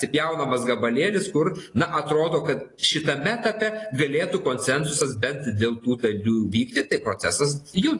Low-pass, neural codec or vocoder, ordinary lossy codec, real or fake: 10.8 kHz; none; AAC, 32 kbps; real